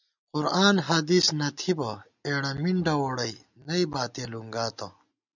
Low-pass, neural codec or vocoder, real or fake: 7.2 kHz; none; real